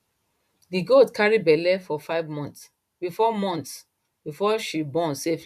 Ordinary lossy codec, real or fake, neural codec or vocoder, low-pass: none; fake; vocoder, 44.1 kHz, 128 mel bands every 512 samples, BigVGAN v2; 14.4 kHz